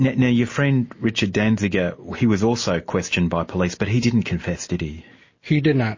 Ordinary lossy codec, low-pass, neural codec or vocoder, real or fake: MP3, 32 kbps; 7.2 kHz; none; real